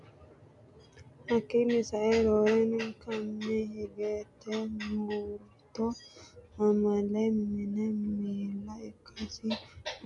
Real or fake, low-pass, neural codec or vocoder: real; 10.8 kHz; none